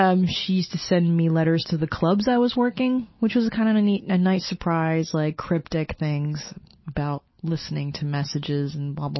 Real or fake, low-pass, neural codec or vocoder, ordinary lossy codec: real; 7.2 kHz; none; MP3, 24 kbps